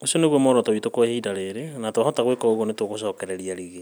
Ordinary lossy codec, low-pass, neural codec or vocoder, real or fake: none; none; none; real